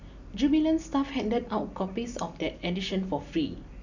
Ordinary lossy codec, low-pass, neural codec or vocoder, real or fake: none; 7.2 kHz; none; real